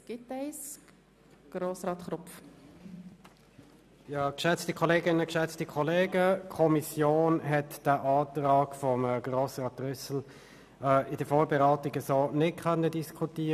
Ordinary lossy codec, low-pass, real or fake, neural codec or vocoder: none; 14.4 kHz; real; none